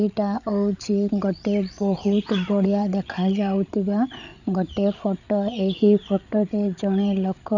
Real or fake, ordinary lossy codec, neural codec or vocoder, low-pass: fake; none; codec, 16 kHz, 8 kbps, FreqCodec, larger model; 7.2 kHz